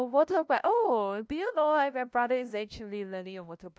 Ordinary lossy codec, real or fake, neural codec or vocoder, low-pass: none; fake; codec, 16 kHz, 0.5 kbps, FunCodec, trained on LibriTTS, 25 frames a second; none